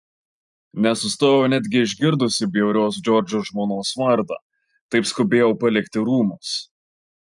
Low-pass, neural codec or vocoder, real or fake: 10.8 kHz; none; real